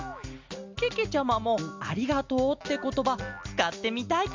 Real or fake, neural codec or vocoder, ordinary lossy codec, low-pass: real; none; MP3, 64 kbps; 7.2 kHz